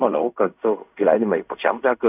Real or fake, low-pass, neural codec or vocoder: fake; 3.6 kHz; codec, 16 kHz in and 24 kHz out, 0.4 kbps, LongCat-Audio-Codec, fine tuned four codebook decoder